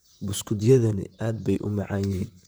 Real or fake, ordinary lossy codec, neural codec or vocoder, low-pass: fake; none; vocoder, 44.1 kHz, 128 mel bands, Pupu-Vocoder; none